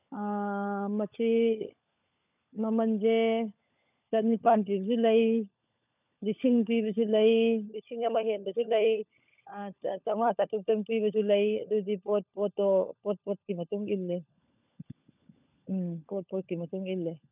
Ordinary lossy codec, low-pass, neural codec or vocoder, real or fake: none; 3.6 kHz; codec, 16 kHz, 16 kbps, FunCodec, trained on LibriTTS, 50 frames a second; fake